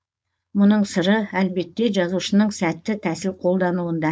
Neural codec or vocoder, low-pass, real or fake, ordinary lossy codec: codec, 16 kHz, 4.8 kbps, FACodec; none; fake; none